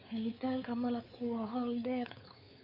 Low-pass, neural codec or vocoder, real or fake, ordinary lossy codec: 5.4 kHz; codec, 16 kHz, 4 kbps, X-Codec, WavLM features, trained on Multilingual LibriSpeech; fake; none